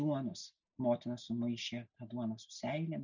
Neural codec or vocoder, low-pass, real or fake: none; 7.2 kHz; real